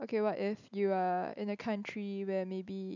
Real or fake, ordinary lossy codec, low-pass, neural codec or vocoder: real; none; 7.2 kHz; none